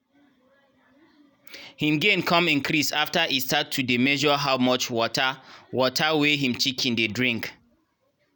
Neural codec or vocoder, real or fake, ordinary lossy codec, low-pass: none; real; none; none